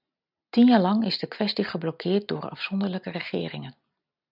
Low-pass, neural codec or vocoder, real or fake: 5.4 kHz; none; real